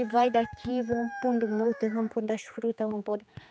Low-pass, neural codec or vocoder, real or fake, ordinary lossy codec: none; codec, 16 kHz, 4 kbps, X-Codec, HuBERT features, trained on general audio; fake; none